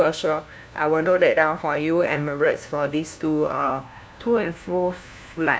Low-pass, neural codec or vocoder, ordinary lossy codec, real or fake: none; codec, 16 kHz, 1 kbps, FunCodec, trained on LibriTTS, 50 frames a second; none; fake